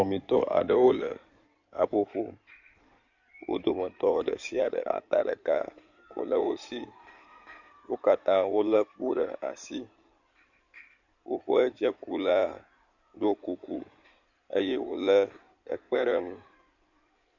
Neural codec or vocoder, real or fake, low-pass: codec, 16 kHz in and 24 kHz out, 2.2 kbps, FireRedTTS-2 codec; fake; 7.2 kHz